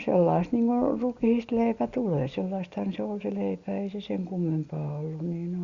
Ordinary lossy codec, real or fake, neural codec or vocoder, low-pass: Opus, 64 kbps; real; none; 7.2 kHz